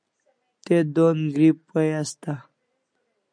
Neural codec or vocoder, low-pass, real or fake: none; 9.9 kHz; real